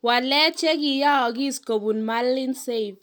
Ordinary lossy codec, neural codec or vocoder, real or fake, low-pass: none; none; real; none